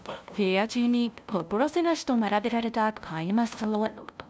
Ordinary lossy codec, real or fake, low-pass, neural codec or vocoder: none; fake; none; codec, 16 kHz, 0.5 kbps, FunCodec, trained on LibriTTS, 25 frames a second